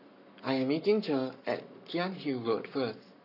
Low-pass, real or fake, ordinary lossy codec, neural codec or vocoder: 5.4 kHz; fake; none; codec, 44.1 kHz, 7.8 kbps, Pupu-Codec